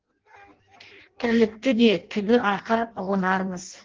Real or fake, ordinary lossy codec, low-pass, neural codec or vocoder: fake; Opus, 16 kbps; 7.2 kHz; codec, 16 kHz in and 24 kHz out, 0.6 kbps, FireRedTTS-2 codec